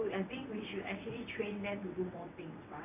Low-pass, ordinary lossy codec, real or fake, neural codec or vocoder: 3.6 kHz; none; fake; vocoder, 22.05 kHz, 80 mel bands, WaveNeXt